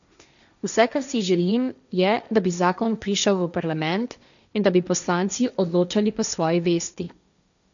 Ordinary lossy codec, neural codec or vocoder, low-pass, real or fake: none; codec, 16 kHz, 1.1 kbps, Voila-Tokenizer; 7.2 kHz; fake